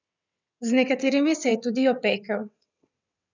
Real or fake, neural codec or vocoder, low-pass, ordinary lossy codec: fake; vocoder, 22.05 kHz, 80 mel bands, WaveNeXt; 7.2 kHz; none